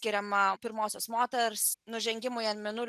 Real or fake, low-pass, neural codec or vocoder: real; 14.4 kHz; none